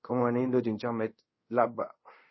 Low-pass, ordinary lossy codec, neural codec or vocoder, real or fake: 7.2 kHz; MP3, 24 kbps; codec, 24 kHz, 0.5 kbps, DualCodec; fake